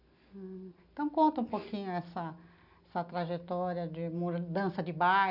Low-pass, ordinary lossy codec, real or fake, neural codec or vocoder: 5.4 kHz; none; real; none